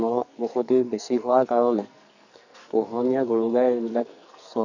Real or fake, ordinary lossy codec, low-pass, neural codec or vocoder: fake; none; 7.2 kHz; codec, 44.1 kHz, 2.6 kbps, SNAC